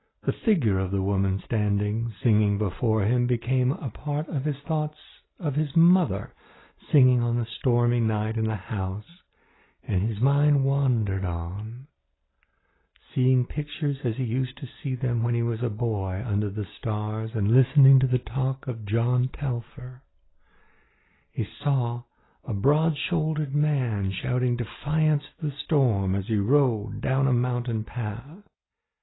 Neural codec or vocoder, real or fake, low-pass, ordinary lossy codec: none; real; 7.2 kHz; AAC, 16 kbps